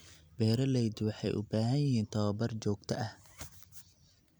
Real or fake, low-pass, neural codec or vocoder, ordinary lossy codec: fake; none; vocoder, 44.1 kHz, 128 mel bands every 256 samples, BigVGAN v2; none